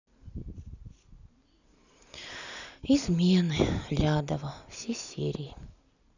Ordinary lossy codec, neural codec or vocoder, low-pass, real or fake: none; none; 7.2 kHz; real